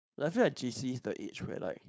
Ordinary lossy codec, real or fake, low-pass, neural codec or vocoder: none; fake; none; codec, 16 kHz, 4.8 kbps, FACodec